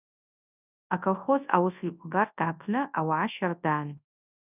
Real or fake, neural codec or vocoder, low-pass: fake; codec, 24 kHz, 0.9 kbps, WavTokenizer, large speech release; 3.6 kHz